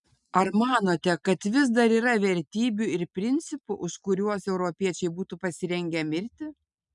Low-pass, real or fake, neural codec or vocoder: 10.8 kHz; real; none